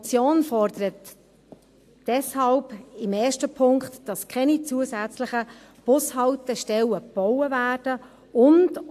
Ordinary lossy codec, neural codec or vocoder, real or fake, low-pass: AAC, 64 kbps; none; real; 14.4 kHz